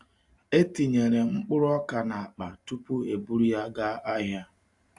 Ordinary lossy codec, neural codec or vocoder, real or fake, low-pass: AAC, 64 kbps; none; real; 10.8 kHz